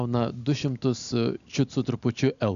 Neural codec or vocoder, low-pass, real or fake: none; 7.2 kHz; real